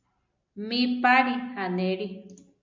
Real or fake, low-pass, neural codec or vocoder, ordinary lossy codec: real; 7.2 kHz; none; MP3, 64 kbps